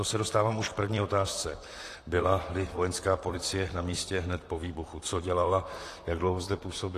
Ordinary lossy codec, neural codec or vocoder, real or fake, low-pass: AAC, 48 kbps; vocoder, 44.1 kHz, 128 mel bands, Pupu-Vocoder; fake; 14.4 kHz